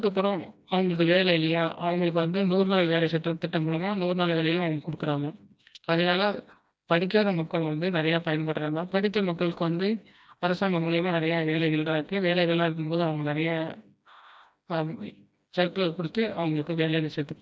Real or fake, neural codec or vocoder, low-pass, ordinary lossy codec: fake; codec, 16 kHz, 1 kbps, FreqCodec, smaller model; none; none